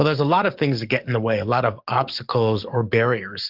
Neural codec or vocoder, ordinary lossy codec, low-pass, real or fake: codec, 44.1 kHz, 7.8 kbps, DAC; Opus, 32 kbps; 5.4 kHz; fake